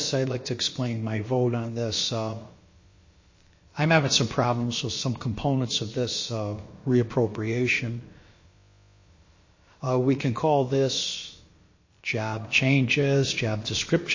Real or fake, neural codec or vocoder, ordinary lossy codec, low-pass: fake; codec, 16 kHz, about 1 kbps, DyCAST, with the encoder's durations; MP3, 32 kbps; 7.2 kHz